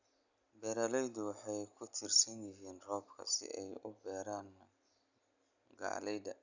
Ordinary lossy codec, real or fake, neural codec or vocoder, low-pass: none; real; none; 7.2 kHz